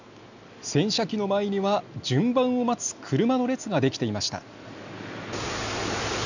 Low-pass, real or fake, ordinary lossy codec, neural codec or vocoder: 7.2 kHz; real; none; none